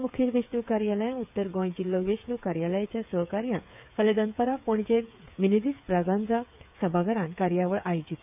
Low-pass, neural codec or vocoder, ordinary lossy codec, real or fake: 3.6 kHz; codec, 16 kHz, 8 kbps, FreqCodec, smaller model; none; fake